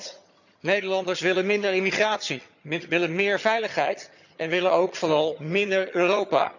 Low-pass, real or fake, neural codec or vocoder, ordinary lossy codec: 7.2 kHz; fake; vocoder, 22.05 kHz, 80 mel bands, HiFi-GAN; none